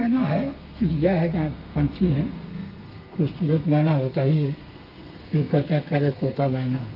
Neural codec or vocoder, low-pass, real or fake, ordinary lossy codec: codec, 32 kHz, 1.9 kbps, SNAC; 5.4 kHz; fake; Opus, 32 kbps